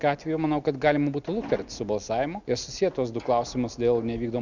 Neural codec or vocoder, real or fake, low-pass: none; real; 7.2 kHz